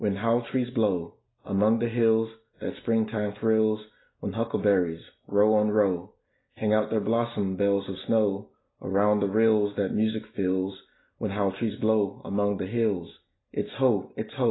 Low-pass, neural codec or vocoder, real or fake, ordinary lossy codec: 7.2 kHz; none; real; AAC, 16 kbps